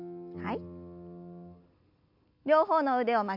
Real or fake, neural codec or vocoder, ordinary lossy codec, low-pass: real; none; MP3, 48 kbps; 5.4 kHz